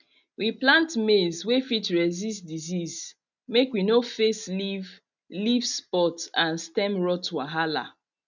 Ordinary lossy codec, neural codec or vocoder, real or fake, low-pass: none; none; real; 7.2 kHz